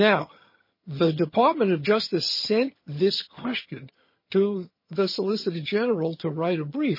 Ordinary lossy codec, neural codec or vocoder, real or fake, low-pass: MP3, 24 kbps; vocoder, 22.05 kHz, 80 mel bands, HiFi-GAN; fake; 5.4 kHz